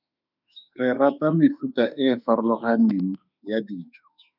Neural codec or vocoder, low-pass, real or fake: autoencoder, 48 kHz, 32 numbers a frame, DAC-VAE, trained on Japanese speech; 5.4 kHz; fake